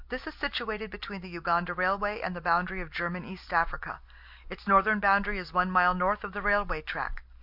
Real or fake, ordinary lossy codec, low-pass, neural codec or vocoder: real; MP3, 48 kbps; 5.4 kHz; none